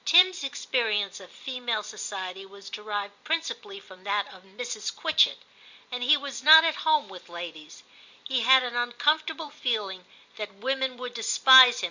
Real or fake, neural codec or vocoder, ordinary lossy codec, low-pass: fake; vocoder, 44.1 kHz, 128 mel bands every 256 samples, BigVGAN v2; Opus, 64 kbps; 7.2 kHz